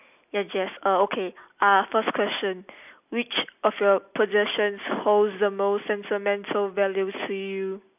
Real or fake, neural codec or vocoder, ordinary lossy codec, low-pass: real; none; none; 3.6 kHz